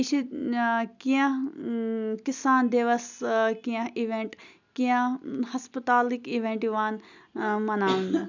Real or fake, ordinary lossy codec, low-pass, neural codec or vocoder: real; none; 7.2 kHz; none